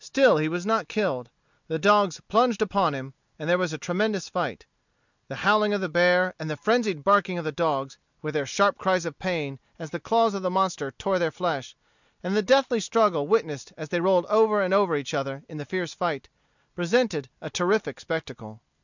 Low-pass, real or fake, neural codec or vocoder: 7.2 kHz; real; none